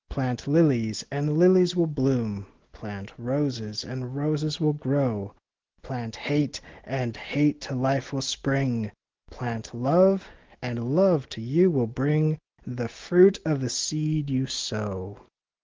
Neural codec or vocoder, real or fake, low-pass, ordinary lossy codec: none; real; 7.2 kHz; Opus, 16 kbps